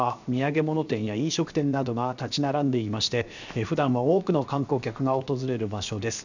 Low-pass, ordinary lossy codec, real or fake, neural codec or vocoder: 7.2 kHz; none; fake; codec, 16 kHz, 0.7 kbps, FocalCodec